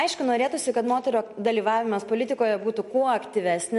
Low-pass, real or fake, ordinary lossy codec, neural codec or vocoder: 14.4 kHz; real; MP3, 48 kbps; none